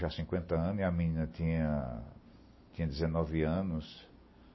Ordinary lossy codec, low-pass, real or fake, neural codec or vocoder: MP3, 24 kbps; 7.2 kHz; real; none